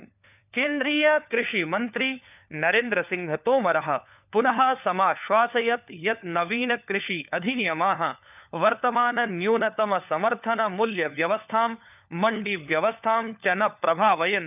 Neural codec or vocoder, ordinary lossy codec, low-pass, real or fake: codec, 16 kHz, 4 kbps, FunCodec, trained on LibriTTS, 50 frames a second; none; 3.6 kHz; fake